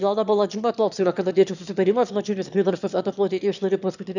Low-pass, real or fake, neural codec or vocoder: 7.2 kHz; fake; autoencoder, 22.05 kHz, a latent of 192 numbers a frame, VITS, trained on one speaker